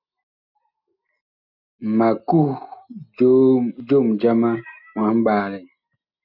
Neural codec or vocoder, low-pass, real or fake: none; 5.4 kHz; real